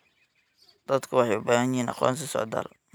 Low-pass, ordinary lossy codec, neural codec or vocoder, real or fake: none; none; none; real